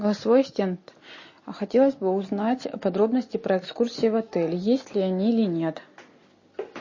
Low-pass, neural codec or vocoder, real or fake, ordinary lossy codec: 7.2 kHz; none; real; MP3, 32 kbps